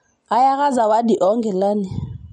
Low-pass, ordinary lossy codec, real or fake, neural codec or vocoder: 19.8 kHz; MP3, 48 kbps; real; none